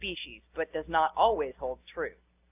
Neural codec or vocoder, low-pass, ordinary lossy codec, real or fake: none; 3.6 kHz; AAC, 32 kbps; real